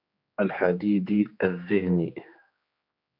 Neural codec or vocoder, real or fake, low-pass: codec, 16 kHz, 2 kbps, X-Codec, HuBERT features, trained on general audio; fake; 5.4 kHz